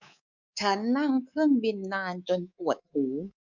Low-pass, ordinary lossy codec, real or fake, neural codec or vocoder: 7.2 kHz; none; fake; codec, 24 kHz, 3.1 kbps, DualCodec